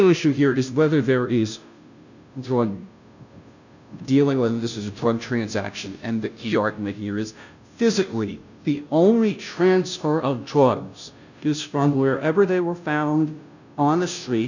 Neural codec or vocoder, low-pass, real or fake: codec, 16 kHz, 0.5 kbps, FunCodec, trained on Chinese and English, 25 frames a second; 7.2 kHz; fake